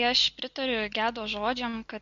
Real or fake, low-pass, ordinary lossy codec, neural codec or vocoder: real; 7.2 kHz; MP3, 48 kbps; none